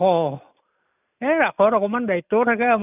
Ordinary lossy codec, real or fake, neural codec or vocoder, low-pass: none; real; none; 3.6 kHz